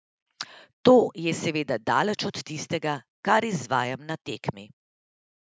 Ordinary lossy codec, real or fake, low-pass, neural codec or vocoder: none; real; none; none